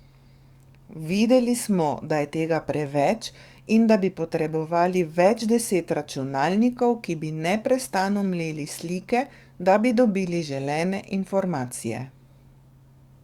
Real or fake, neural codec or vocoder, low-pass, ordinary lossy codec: fake; codec, 44.1 kHz, 7.8 kbps, DAC; 19.8 kHz; Opus, 64 kbps